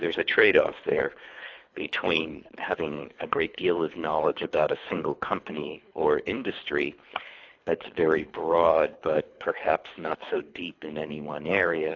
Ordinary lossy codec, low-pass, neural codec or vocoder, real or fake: AAC, 48 kbps; 7.2 kHz; codec, 24 kHz, 3 kbps, HILCodec; fake